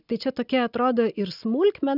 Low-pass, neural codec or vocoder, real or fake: 5.4 kHz; none; real